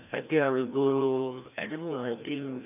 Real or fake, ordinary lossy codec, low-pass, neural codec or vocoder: fake; none; 3.6 kHz; codec, 16 kHz, 1 kbps, FreqCodec, larger model